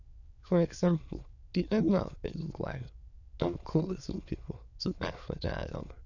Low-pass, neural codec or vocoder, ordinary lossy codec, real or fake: 7.2 kHz; autoencoder, 22.05 kHz, a latent of 192 numbers a frame, VITS, trained on many speakers; none; fake